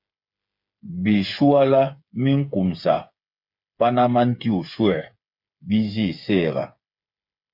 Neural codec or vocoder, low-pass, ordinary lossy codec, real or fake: codec, 16 kHz, 8 kbps, FreqCodec, smaller model; 5.4 kHz; MP3, 48 kbps; fake